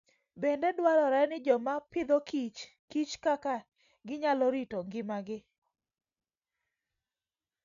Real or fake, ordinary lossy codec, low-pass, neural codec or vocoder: real; none; 7.2 kHz; none